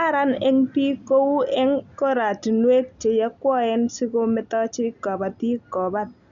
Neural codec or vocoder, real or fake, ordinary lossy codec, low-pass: none; real; none; 7.2 kHz